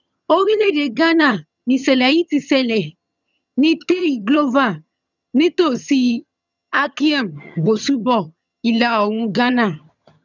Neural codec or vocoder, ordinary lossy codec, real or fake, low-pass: vocoder, 22.05 kHz, 80 mel bands, HiFi-GAN; none; fake; 7.2 kHz